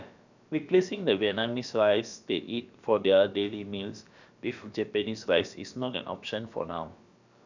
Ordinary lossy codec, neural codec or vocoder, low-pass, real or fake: none; codec, 16 kHz, about 1 kbps, DyCAST, with the encoder's durations; 7.2 kHz; fake